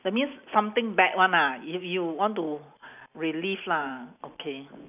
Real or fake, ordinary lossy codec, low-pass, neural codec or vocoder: real; none; 3.6 kHz; none